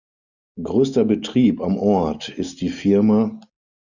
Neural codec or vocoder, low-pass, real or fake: none; 7.2 kHz; real